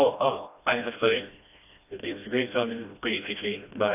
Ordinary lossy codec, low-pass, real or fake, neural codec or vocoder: none; 3.6 kHz; fake; codec, 16 kHz, 1 kbps, FreqCodec, smaller model